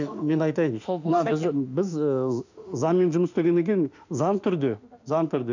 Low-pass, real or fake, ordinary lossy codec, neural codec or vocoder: 7.2 kHz; fake; none; autoencoder, 48 kHz, 32 numbers a frame, DAC-VAE, trained on Japanese speech